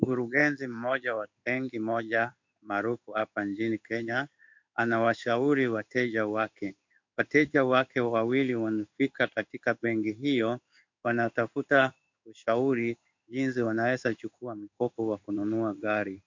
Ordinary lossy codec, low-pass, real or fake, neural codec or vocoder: MP3, 64 kbps; 7.2 kHz; fake; codec, 16 kHz in and 24 kHz out, 1 kbps, XY-Tokenizer